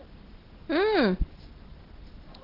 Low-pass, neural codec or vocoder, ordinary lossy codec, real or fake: 5.4 kHz; none; Opus, 16 kbps; real